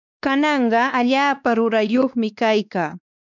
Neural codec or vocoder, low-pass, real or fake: codec, 16 kHz, 2 kbps, X-Codec, WavLM features, trained on Multilingual LibriSpeech; 7.2 kHz; fake